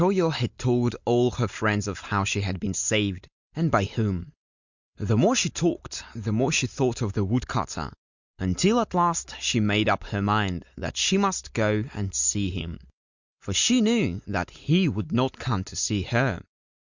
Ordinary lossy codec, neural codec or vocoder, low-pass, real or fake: Opus, 64 kbps; none; 7.2 kHz; real